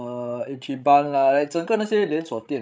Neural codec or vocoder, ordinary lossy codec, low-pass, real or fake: codec, 16 kHz, 16 kbps, FreqCodec, larger model; none; none; fake